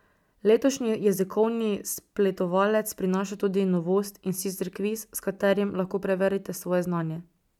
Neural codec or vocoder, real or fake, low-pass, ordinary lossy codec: none; real; 19.8 kHz; none